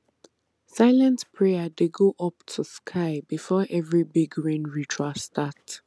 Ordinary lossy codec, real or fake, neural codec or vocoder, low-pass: none; real; none; none